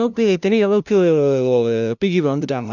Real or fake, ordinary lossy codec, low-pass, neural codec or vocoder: fake; none; 7.2 kHz; codec, 16 kHz, 0.5 kbps, FunCodec, trained on LibriTTS, 25 frames a second